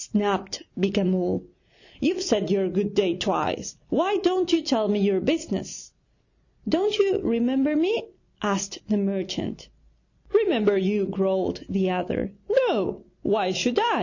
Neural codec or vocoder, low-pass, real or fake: none; 7.2 kHz; real